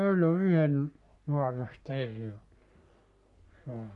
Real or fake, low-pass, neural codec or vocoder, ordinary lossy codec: fake; 10.8 kHz; codec, 44.1 kHz, 3.4 kbps, Pupu-Codec; none